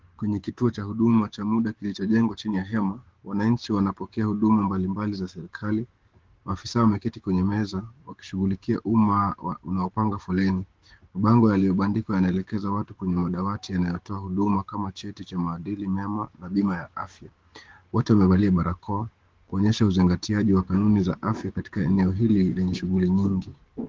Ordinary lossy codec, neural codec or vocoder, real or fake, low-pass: Opus, 16 kbps; codec, 16 kHz, 6 kbps, DAC; fake; 7.2 kHz